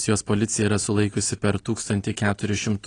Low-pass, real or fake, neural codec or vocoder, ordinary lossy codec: 9.9 kHz; real; none; AAC, 32 kbps